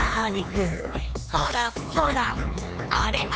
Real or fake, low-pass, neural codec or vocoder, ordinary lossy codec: fake; none; codec, 16 kHz, 4 kbps, X-Codec, HuBERT features, trained on LibriSpeech; none